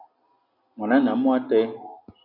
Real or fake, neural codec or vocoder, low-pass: real; none; 5.4 kHz